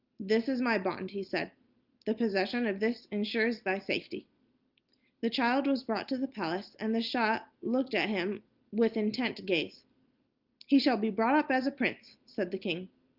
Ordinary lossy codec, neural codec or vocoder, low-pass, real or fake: Opus, 24 kbps; none; 5.4 kHz; real